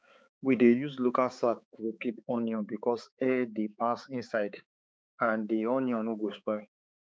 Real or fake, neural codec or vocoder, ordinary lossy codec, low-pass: fake; codec, 16 kHz, 4 kbps, X-Codec, HuBERT features, trained on balanced general audio; none; none